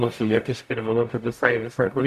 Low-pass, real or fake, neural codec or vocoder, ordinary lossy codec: 14.4 kHz; fake; codec, 44.1 kHz, 0.9 kbps, DAC; MP3, 96 kbps